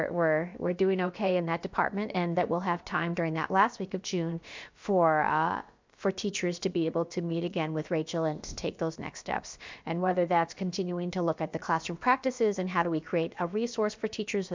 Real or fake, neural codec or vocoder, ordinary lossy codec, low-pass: fake; codec, 16 kHz, about 1 kbps, DyCAST, with the encoder's durations; MP3, 64 kbps; 7.2 kHz